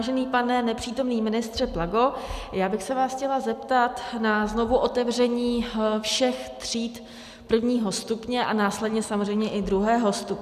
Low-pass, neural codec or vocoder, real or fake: 14.4 kHz; none; real